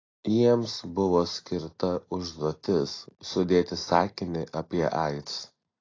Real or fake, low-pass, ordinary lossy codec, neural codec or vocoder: real; 7.2 kHz; AAC, 32 kbps; none